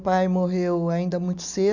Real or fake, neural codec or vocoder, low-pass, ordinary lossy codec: real; none; 7.2 kHz; none